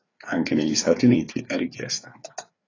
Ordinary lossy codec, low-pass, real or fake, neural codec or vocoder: AAC, 32 kbps; 7.2 kHz; fake; codec, 16 kHz, 4 kbps, FreqCodec, larger model